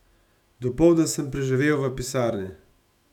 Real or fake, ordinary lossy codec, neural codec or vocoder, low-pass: fake; none; autoencoder, 48 kHz, 128 numbers a frame, DAC-VAE, trained on Japanese speech; 19.8 kHz